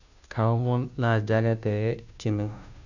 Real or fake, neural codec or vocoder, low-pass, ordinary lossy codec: fake; codec, 16 kHz, 0.5 kbps, FunCodec, trained on Chinese and English, 25 frames a second; 7.2 kHz; none